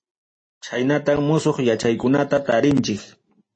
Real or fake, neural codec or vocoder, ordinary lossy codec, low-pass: real; none; MP3, 32 kbps; 9.9 kHz